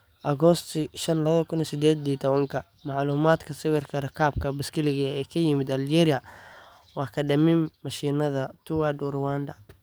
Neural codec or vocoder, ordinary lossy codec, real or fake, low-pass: codec, 44.1 kHz, 7.8 kbps, DAC; none; fake; none